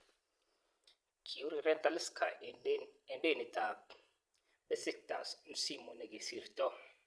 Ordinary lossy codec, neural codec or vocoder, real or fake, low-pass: none; vocoder, 22.05 kHz, 80 mel bands, Vocos; fake; none